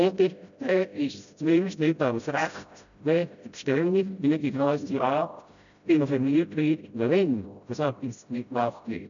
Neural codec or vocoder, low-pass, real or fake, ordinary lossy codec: codec, 16 kHz, 0.5 kbps, FreqCodec, smaller model; 7.2 kHz; fake; none